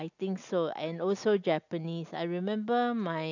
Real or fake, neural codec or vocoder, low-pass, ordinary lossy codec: real; none; 7.2 kHz; none